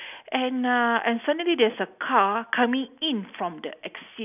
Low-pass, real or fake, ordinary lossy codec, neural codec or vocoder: 3.6 kHz; real; none; none